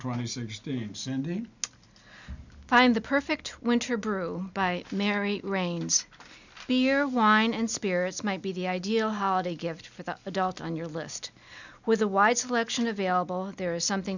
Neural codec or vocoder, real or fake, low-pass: none; real; 7.2 kHz